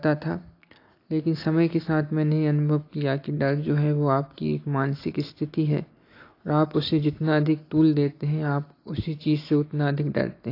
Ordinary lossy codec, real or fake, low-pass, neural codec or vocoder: AAC, 32 kbps; real; 5.4 kHz; none